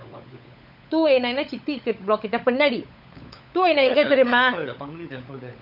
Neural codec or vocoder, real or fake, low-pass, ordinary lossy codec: codec, 16 kHz, 16 kbps, FunCodec, trained on LibriTTS, 50 frames a second; fake; 5.4 kHz; none